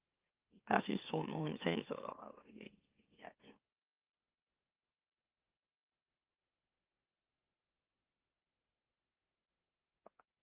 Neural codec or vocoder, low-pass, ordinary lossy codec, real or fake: autoencoder, 44.1 kHz, a latent of 192 numbers a frame, MeloTTS; 3.6 kHz; Opus, 24 kbps; fake